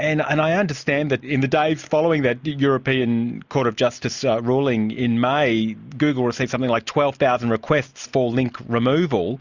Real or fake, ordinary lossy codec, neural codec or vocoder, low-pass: real; Opus, 64 kbps; none; 7.2 kHz